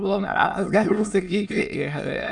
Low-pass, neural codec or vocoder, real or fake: 9.9 kHz; autoencoder, 22.05 kHz, a latent of 192 numbers a frame, VITS, trained on many speakers; fake